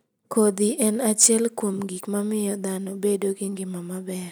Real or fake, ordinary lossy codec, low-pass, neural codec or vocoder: real; none; none; none